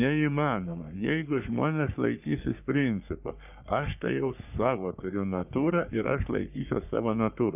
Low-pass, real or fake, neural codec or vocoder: 3.6 kHz; fake; codec, 44.1 kHz, 3.4 kbps, Pupu-Codec